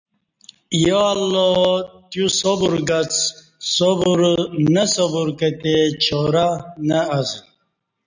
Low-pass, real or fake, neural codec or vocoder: 7.2 kHz; real; none